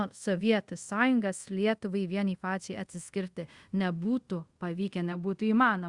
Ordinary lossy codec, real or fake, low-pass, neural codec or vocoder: Opus, 64 kbps; fake; 10.8 kHz; codec, 24 kHz, 0.5 kbps, DualCodec